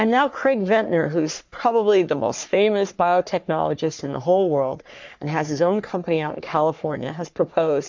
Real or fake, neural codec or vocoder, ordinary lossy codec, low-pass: fake; codec, 44.1 kHz, 3.4 kbps, Pupu-Codec; MP3, 64 kbps; 7.2 kHz